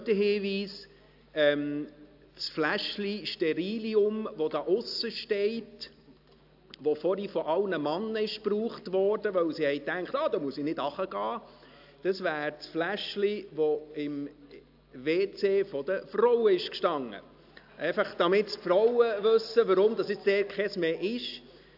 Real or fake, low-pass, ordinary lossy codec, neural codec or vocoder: real; 5.4 kHz; AAC, 48 kbps; none